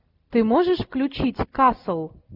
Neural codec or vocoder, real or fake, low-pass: none; real; 5.4 kHz